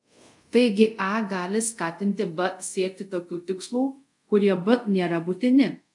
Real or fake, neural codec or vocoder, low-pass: fake; codec, 24 kHz, 0.5 kbps, DualCodec; 10.8 kHz